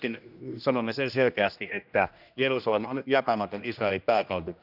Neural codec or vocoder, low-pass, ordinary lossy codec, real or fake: codec, 16 kHz, 1 kbps, X-Codec, HuBERT features, trained on general audio; 5.4 kHz; none; fake